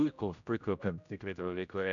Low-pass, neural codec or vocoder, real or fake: 7.2 kHz; codec, 16 kHz, 0.5 kbps, X-Codec, HuBERT features, trained on general audio; fake